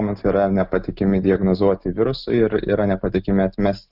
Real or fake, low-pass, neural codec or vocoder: real; 5.4 kHz; none